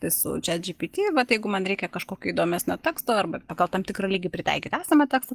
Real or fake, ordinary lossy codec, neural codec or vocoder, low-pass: real; Opus, 24 kbps; none; 14.4 kHz